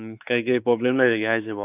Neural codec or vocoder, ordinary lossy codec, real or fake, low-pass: codec, 16 kHz, 4 kbps, X-Codec, WavLM features, trained on Multilingual LibriSpeech; AAC, 32 kbps; fake; 3.6 kHz